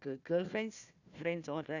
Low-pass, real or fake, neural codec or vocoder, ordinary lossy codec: 7.2 kHz; fake; codec, 16 kHz, 1 kbps, FunCodec, trained on Chinese and English, 50 frames a second; none